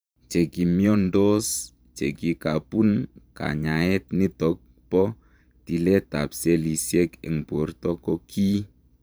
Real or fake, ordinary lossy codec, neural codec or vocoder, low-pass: fake; none; vocoder, 44.1 kHz, 128 mel bands every 512 samples, BigVGAN v2; none